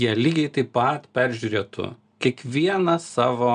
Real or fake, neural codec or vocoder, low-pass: real; none; 9.9 kHz